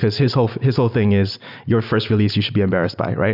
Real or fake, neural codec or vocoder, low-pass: real; none; 5.4 kHz